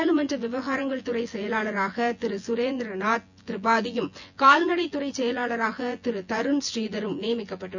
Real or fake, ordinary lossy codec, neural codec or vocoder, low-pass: fake; none; vocoder, 24 kHz, 100 mel bands, Vocos; 7.2 kHz